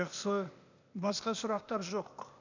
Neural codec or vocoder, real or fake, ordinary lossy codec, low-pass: codec, 16 kHz, 0.8 kbps, ZipCodec; fake; none; 7.2 kHz